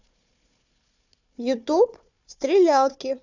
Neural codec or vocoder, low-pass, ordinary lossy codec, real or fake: codec, 16 kHz, 4 kbps, FunCodec, trained on Chinese and English, 50 frames a second; 7.2 kHz; none; fake